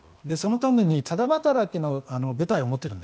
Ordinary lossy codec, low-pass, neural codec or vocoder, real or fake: none; none; codec, 16 kHz, 0.8 kbps, ZipCodec; fake